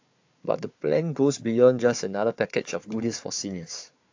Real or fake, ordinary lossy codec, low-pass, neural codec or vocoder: fake; AAC, 48 kbps; 7.2 kHz; codec, 16 kHz, 4 kbps, FunCodec, trained on Chinese and English, 50 frames a second